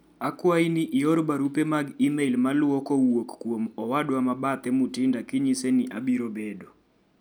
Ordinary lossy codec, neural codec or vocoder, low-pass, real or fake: none; none; none; real